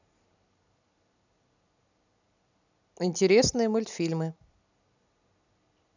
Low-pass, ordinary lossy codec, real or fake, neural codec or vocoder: 7.2 kHz; none; real; none